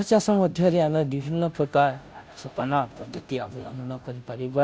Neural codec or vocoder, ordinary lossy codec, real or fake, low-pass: codec, 16 kHz, 0.5 kbps, FunCodec, trained on Chinese and English, 25 frames a second; none; fake; none